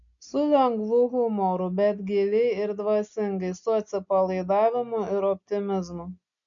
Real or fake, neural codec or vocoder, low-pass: real; none; 7.2 kHz